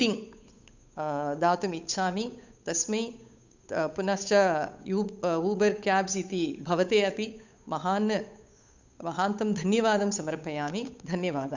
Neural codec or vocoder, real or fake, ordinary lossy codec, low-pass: codec, 16 kHz, 8 kbps, FunCodec, trained on Chinese and English, 25 frames a second; fake; none; 7.2 kHz